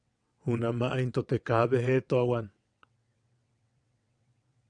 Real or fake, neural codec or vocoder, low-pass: fake; vocoder, 22.05 kHz, 80 mel bands, WaveNeXt; 9.9 kHz